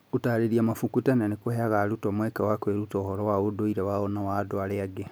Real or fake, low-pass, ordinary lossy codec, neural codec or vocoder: real; none; none; none